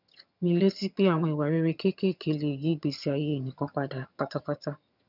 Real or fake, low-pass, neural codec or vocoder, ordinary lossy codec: fake; 5.4 kHz; vocoder, 22.05 kHz, 80 mel bands, HiFi-GAN; none